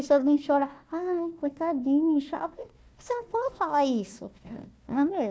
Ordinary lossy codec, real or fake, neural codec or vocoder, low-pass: none; fake; codec, 16 kHz, 1 kbps, FunCodec, trained on Chinese and English, 50 frames a second; none